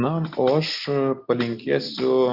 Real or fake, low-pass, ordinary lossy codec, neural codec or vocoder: real; 5.4 kHz; Opus, 64 kbps; none